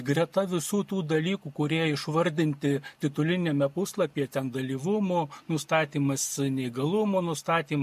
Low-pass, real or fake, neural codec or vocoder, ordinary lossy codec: 14.4 kHz; real; none; MP3, 64 kbps